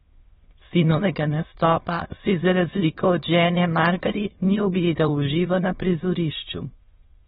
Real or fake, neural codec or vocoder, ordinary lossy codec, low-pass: fake; autoencoder, 22.05 kHz, a latent of 192 numbers a frame, VITS, trained on many speakers; AAC, 16 kbps; 9.9 kHz